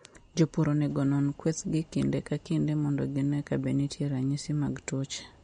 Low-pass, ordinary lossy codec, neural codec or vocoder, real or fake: 9.9 kHz; MP3, 48 kbps; none; real